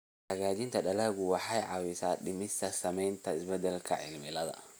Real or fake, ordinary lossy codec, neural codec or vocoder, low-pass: real; none; none; none